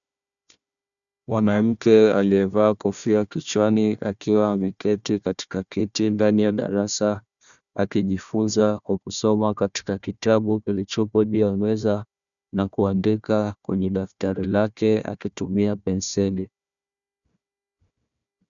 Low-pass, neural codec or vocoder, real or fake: 7.2 kHz; codec, 16 kHz, 1 kbps, FunCodec, trained on Chinese and English, 50 frames a second; fake